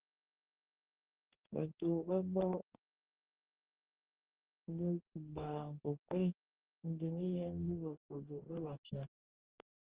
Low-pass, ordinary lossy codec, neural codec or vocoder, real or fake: 3.6 kHz; Opus, 16 kbps; codec, 44.1 kHz, 2.6 kbps, DAC; fake